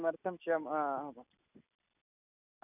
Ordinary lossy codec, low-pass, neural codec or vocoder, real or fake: none; 3.6 kHz; none; real